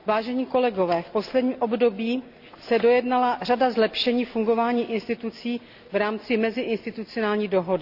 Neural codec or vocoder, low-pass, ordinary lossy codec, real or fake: none; 5.4 kHz; Opus, 64 kbps; real